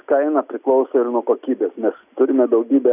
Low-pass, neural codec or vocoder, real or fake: 3.6 kHz; none; real